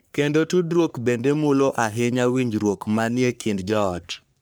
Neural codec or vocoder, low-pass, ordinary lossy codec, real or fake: codec, 44.1 kHz, 3.4 kbps, Pupu-Codec; none; none; fake